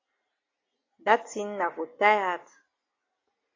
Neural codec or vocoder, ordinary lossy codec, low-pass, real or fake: none; AAC, 32 kbps; 7.2 kHz; real